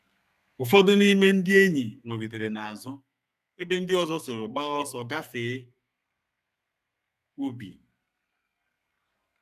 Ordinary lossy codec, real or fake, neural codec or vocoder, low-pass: none; fake; codec, 32 kHz, 1.9 kbps, SNAC; 14.4 kHz